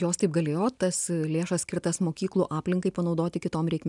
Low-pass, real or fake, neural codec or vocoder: 10.8 kHz; real; none